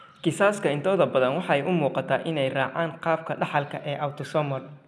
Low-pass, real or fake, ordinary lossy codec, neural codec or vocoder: none; real; none; none